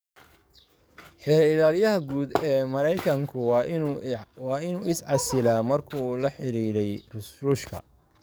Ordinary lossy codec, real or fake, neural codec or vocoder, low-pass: none; fake; codec, 44.1 kHz, 7.8 kbps, DAC; none